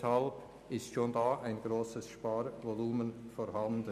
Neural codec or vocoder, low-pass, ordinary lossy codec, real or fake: none; 14.4 kHz; none; real